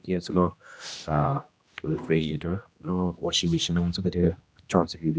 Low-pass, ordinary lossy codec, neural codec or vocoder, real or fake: none; none; codec, 16 kHz, 1 kbps, X-Codec, HuBERT features, trained on general audio; fake